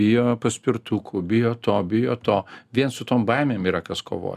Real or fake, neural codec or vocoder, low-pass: real; none; 14.4 kHz